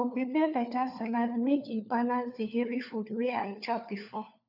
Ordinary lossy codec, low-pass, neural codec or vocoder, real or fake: none; 5.4 kHz; codec, 16 kHz, 4 kbps, FunCodec, trained on LibriTTS, 50 frames a second; fake